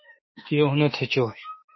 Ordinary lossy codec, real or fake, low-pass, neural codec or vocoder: MP3, 24 kbps; fake; 7.2 kHz; autoencoder, 48 kHz, 32 numbers a frame, DAC-VAE, trained on Japanese speech